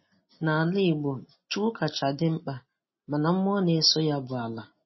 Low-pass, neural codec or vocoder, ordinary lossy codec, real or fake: 7.2 kHz; none; MP3, 24 kbps; real